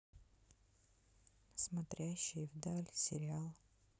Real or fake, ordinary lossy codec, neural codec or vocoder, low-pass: real; none; none; none